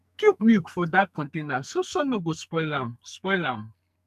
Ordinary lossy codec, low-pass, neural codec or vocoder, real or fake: none; 14.4 kHz; codec, 44.1 kHz, 2.6 kbps, SNAC; fake